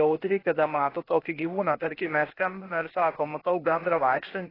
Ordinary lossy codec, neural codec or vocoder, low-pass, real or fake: AAC, 24 kbps; codec, 16 kHz, 0.8 kbps, ZipCodec; 5.4 kHz; fake